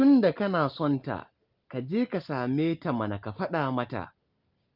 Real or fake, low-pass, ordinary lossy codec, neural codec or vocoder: real; 5.4 kHz; Opus, 32 kbps; none